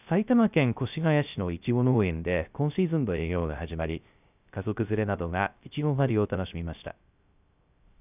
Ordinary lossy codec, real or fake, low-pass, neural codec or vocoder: none; fake; 3.6 kHz; codec, 16 kHz, 0.3 kbps, FocalCodec